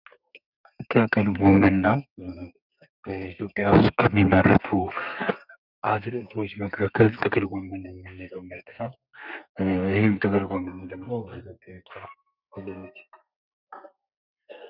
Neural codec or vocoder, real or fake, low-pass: codec, 32 kHz, 1.9 kbps, SNAC; fake; 5.4 kHz